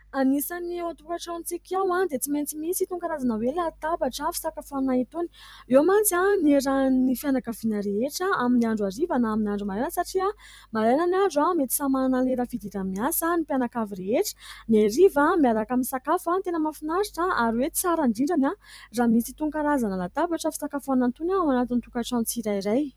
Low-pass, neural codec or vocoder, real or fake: 19.8 kHz; vocoder, 44.1 kHz, 128 mel bands every 256 samples, BigVGAN v2; fake